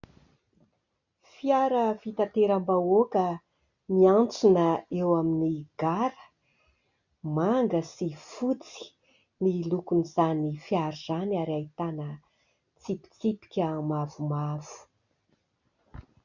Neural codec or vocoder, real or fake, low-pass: none; real; 7.2 kHz